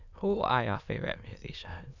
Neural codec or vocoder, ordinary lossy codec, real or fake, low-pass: autoencoder, 22.05 kHz, a latent of 192 numbers a frame, VITS, trained on many speakers; none; fake; 7.2 kHz